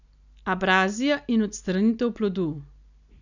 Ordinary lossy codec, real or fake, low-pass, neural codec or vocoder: none; real; 7.2 kHz; none